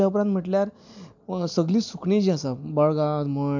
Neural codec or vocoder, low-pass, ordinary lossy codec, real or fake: none; 7.2 kHz; none; real